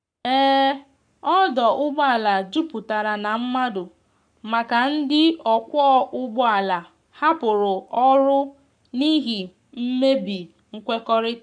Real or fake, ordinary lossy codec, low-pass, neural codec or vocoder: fake; none; 9.9 kHz; codec, 44.1 kHz, 7.8 kbps, Pupu-Codec